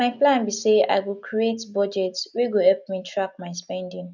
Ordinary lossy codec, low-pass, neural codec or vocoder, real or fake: none; 7.2 kHz; none; real